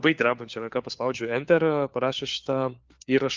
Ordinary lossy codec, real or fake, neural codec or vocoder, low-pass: Opus, 32 kbps; fake; codec, 16 kHz, 4 kbps, FunCodec, trained on LibriTTS, 50 frames a second; 7.2 kHz